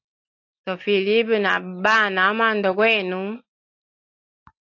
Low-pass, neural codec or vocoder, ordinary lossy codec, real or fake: 7.2 kHz; none; AAC, 48 kbps; real